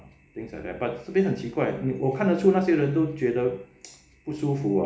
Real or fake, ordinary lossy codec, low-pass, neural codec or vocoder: real; none; none; none